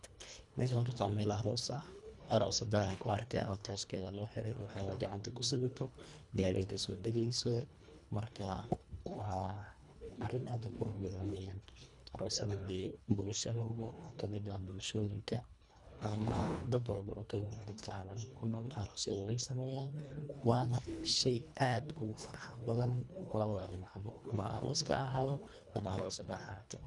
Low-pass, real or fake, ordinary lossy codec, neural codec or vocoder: 10.8 kHz; fake; none; codec, 24 kHz, 1.5 kbps, HILCodec